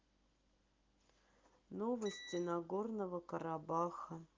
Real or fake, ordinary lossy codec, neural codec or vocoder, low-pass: real; Opus, 32 kbps; none; 7.2 kHz